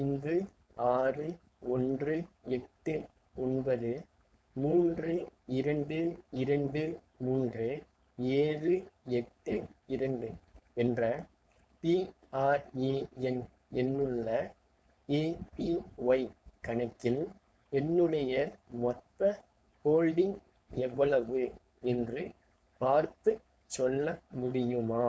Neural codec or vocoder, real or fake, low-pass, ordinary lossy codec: codec, 16 kHz, 4.8 kbps, FACodec; fake; none; none